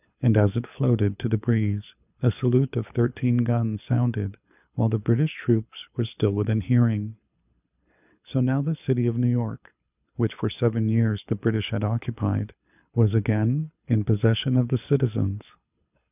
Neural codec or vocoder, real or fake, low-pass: codec, 24 kHz, 6 kbps, HILCodec; fake; 3.6 kHz